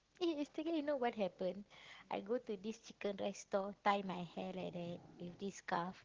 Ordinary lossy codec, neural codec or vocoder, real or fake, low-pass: Opus, 16 kbps; none; real; 7.2 kHz